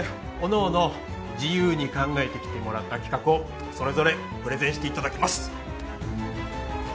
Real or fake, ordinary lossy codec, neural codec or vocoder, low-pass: real; none; none; none